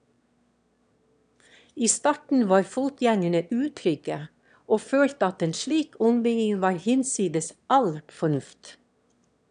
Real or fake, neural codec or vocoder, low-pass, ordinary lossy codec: fake; autoencoder, 22.05 kHz, a latent of 192 numbers a frame, VITS, trained on one speaker; 9.9 kHz; none